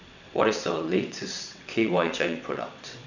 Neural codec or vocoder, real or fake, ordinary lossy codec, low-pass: vocoder, 22.05 kHz, 80 mel bands, WaveNeXt; fake; none; 7.2 kHz